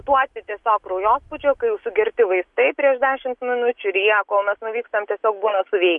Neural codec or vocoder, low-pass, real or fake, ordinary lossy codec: none; 10.8 kHz; real; MP3, 64 kbps